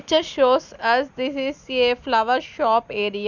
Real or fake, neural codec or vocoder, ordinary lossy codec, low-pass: real; none; none; 7.2 kHz